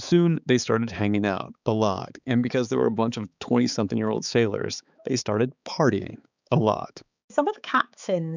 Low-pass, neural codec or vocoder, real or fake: 7.2 kHz; codec, 16 kHz, 4 kbps, X-Codec, HuBERT features, trained on balanced general audio; fake